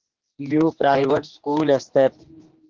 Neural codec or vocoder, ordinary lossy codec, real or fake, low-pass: codec, 16 kHz, 2 kbps, X-Codec, HuBERT features, trained on general audio; Opus, 16 kbps; fake; 7.2 kHz